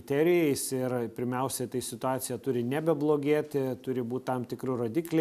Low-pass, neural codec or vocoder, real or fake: 14.4 kHz; none; real